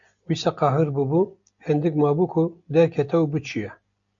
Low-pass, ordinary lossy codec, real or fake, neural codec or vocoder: 7.2 kHz; AAC, 64 kbps; real; none